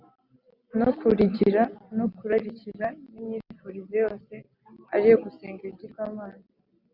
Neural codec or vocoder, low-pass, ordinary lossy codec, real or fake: none; 5.4 kHz; Opus, 64 kbps; real